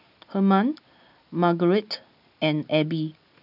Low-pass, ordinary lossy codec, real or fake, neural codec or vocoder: 5.4 kHz; none; real; none